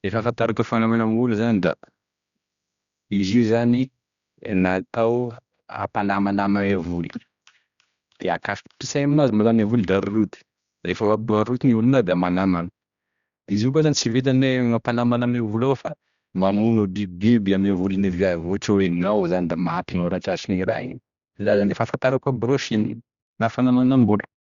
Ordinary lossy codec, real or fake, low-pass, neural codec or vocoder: Opus, 64 kbps; fake; 7.2 kHz; codec, 16 kHz, 1 kbps, X-Codec, HuBERT features, trained on general audio